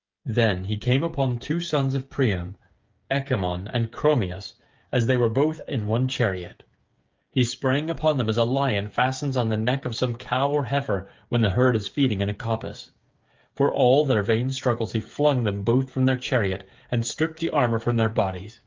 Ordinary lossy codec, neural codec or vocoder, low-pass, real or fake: Opus, 24 kbps; codec, 16 kHz, 8 kbps, FreqCodec, smaller model; 7.2 kHz; fake